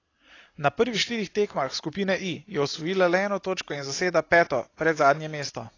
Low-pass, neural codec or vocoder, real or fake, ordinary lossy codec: 7.2 kHz; none; real; AAC, 32 kbps